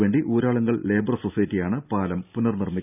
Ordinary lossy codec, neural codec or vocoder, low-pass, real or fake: none; none; 3.6 kHz; real